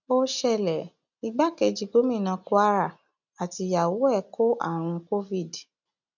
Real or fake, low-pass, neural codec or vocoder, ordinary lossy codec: real; 7.2 kHz; none; none